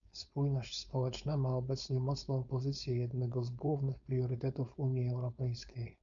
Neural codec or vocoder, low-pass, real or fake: codec, 16 kHz, 4.8 kbps, FACodec; 7.2 kHz; fake